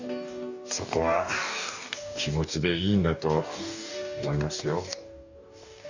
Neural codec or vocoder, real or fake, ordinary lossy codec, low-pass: codec, 44.1 kHz, 2.6 kbps, DAC; fake; none; 7.2 kHz